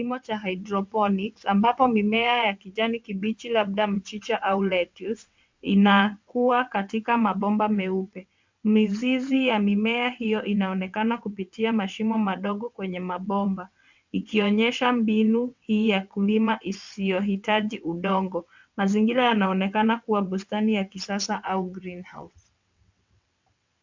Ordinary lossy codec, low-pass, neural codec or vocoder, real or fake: MP3, 64 kbps; 7.2 kHz; vocoder, 22.05 kHz, 80 mel bands, WaveNeXt; fake